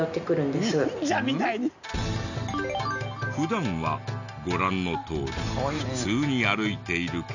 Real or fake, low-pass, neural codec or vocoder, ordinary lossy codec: real; 7.2 kHz; none; none